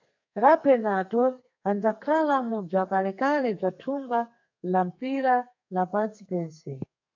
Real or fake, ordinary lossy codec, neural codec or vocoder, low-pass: fake; MP3, 64 kbps; codec, 32 kHz, 1.9 kbps, SNAC; 7.2 kHz